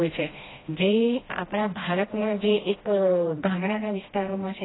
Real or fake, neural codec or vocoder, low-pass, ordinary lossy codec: fake; codec, 16 kHz, 1 kbps, FreqCodec, smaller model; 7.2 kHz; AAC, 16 kbps